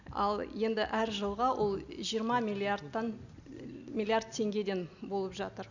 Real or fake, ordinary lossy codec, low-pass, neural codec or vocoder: real; none; 7.2 kHz; none